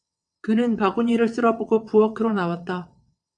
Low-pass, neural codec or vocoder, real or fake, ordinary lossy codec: 9.9 kHz; vocoder, 22.05 kHz, 80 mel bands, WaveNeXt; fake; AAC, 64 kbps